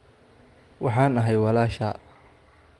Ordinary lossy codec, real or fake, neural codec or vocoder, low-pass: Opus, 24 kbps; real; none; 10.8 kHz